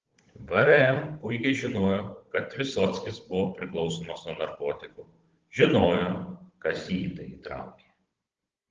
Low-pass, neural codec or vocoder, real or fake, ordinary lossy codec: 7.2 kHz; codec, 16 kHz, 16 kbps, FunCodec, trained on Chinese and English, 50 frames a second; fake; Opus, 16 kbps